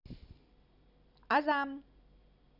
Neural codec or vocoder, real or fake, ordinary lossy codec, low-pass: none; real; none; 5.4 kHz